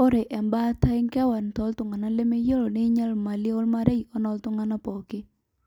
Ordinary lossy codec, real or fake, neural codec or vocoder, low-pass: none; real; none; 19.8 kHz